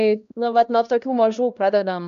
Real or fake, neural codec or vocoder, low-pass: fake; codec, 16 kHz, 1 kbps, X-Codec, HuBERT features, trained on LibriSpeech; 7.2 kHz